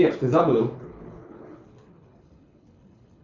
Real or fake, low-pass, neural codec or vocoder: fake; 7.2 kHz; codec, 24 kHz, 6 kbps, HILCodec